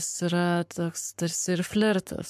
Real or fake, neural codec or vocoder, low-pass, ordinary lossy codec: fake; codec, 44.1 kHz, 7.8 kbps, Pupu-Codec; 14.4 kHz; MP3, 96 kbps